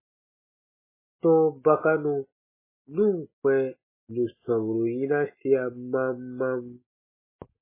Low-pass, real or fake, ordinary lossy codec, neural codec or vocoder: 3.6 kHz; real; MP3, 16 kbps; none